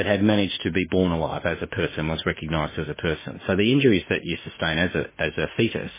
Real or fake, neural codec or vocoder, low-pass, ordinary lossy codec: fake; autoencoder, 48 kHz, 32 numbers a frame, DAC-VAE, trained on Japanese speech; 3.6 kHz; MP3, 16 kbps